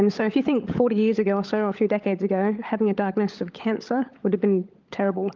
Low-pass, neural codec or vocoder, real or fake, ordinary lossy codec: 7.2 kHz; codec, 16 kHz, 16 kbps, FunCodec, trained on LibriTTS, 50 frames a second; fake; Opus, 24 kbps